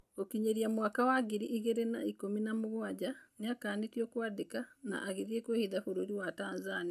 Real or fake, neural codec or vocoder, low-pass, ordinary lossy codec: real; none; 14.4 kHz; none